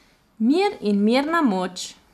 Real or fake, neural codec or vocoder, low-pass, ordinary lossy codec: fake; vocoder, 44.1 kHz, 128 mel bands every 256 samples, BigVGAN v2; 14.4 kHz; none